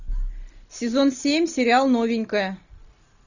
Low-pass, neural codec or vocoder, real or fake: 7.2 kHz; none; real